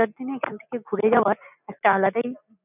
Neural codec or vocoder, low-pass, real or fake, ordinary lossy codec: none; 3.6 kHz; real; none